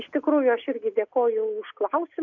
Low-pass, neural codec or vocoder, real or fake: 7.2 kHz; none; real